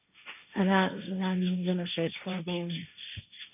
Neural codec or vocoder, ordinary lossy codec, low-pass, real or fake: codec, 16 kHz, 1.1 kbps, Voila-Tokenizer; none; 3.6 kHz; fake